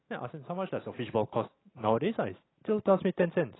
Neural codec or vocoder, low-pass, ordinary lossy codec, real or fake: none; 7.2 kHz; AAC, 16 kbps; real